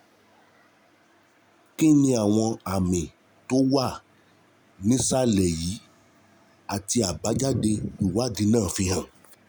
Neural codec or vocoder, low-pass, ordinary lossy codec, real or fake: none; none; none; real